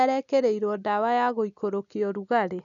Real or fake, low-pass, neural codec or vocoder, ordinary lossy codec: real; 7.2 kHz; none; none